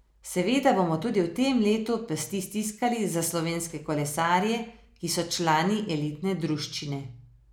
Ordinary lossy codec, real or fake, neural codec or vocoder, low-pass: none; real; none; none